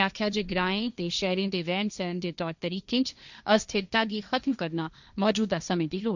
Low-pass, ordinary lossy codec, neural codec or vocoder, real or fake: 7.2 kHz; none; codec, 16 kHz, 1.1 kbps, Voila-Tokenizer; fake